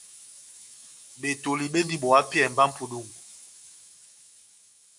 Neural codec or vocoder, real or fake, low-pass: autoencoder, 48 kHz, 128 numbers a frame, DAC-VAE, trained on Japanese speech; fake; 10.8 kHz